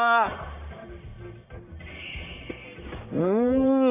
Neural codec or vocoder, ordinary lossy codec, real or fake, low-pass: codec, 44.1 kHz, 1.7 kbps, Pupu-Codec; none; fake; 3.6 kHz